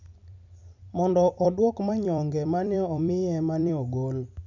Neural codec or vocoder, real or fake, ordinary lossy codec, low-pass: vocoder, 44.1 kHz, 128 mel bands every 256 samples, BigVGAN v2; fake; none; 7.2 kHz